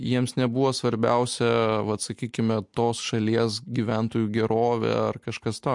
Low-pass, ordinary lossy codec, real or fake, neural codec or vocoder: 10.8 kHz; MP3, 64 kbps; real; none